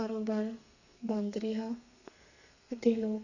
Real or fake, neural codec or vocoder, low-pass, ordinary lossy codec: fake; codec, 32 kHz, 1.9 kbps, SNAC; 7.2 kHz; none